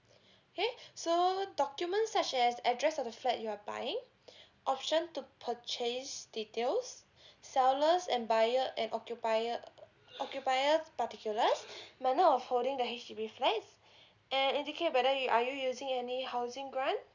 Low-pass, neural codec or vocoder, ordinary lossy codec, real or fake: 7.2 kHz; none; none; real